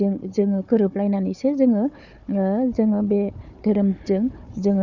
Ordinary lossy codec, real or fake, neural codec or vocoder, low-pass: none; fake; codec, 16 kHz, 4 kbps, FunCodec, trained on Chinese and English, 50 frames a second; 7.2 kHz